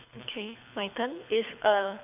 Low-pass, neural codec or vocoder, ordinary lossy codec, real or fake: 3.6 kHz; codec, 24 kHz, 6 kbps, HILCodec; none; fake